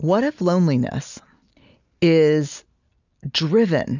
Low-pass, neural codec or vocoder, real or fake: 7.2 kHz; none; real